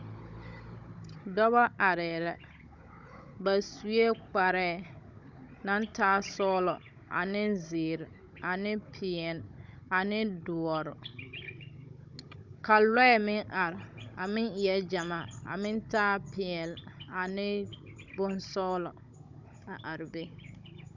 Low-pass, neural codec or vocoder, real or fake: 7.2 kHz; codec, 16 kHz, 16 kbps, FunCodec, trained on Chinese and English, 50 frames a second; fake